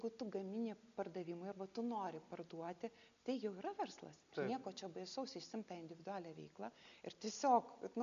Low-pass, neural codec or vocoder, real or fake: 7.2 kHz; none; real